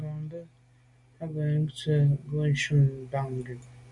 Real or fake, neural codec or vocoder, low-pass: real; none; 10.8 kHz